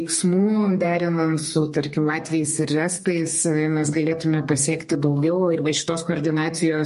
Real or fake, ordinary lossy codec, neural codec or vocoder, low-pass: fake; MP3, 48 kbps; codec, 32 kHz, 1.9 kbps, SNAC; 14.4 kHz